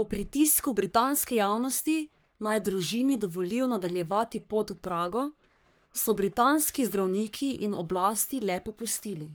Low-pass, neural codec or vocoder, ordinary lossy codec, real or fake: none; codec, 44.1 kHz, 3.4 kbps, Pupu-Codec; none; fake